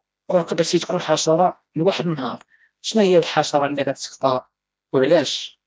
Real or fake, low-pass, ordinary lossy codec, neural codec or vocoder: fake; none; none; codec, 16 kHz, 1 kbps, FreqCodec, smaller model